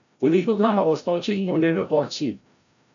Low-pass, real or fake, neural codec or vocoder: 7.2 kHz; fake; codec, 16 kHz, 0.5 kbps, FreqCodec, larger model